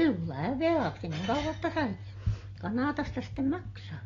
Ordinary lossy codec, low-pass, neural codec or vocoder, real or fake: AAC, 32 kbps; 7.2 kHz; none; real